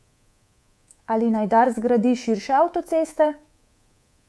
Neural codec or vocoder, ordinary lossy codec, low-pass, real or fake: codec, 24 kHz, 3.1 kbps, DualCodec; none; none; fake